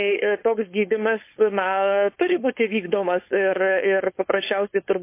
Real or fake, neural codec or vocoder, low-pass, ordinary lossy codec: fake; codec, 16 kHz, 4.8 kbps, FACodec; 3.6 kHz; MP3, 24 kbps